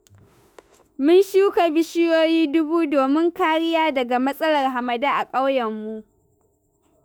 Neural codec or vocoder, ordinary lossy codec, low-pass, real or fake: autoencoder, 48 kHz, 32 numbers a frame, DAC-VAE, trained on Japanese speech; none; none; fake